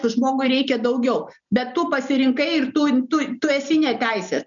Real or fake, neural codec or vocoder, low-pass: real; none; 7.2 kHz